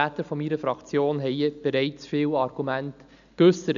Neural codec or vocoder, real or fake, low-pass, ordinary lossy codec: none; real; 7.2 kHz; none